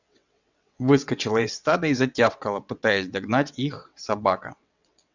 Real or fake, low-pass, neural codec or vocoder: fake; 7.2 kHz; vocoder, 22.05 kHz, 80 mel bands, WaveNeXt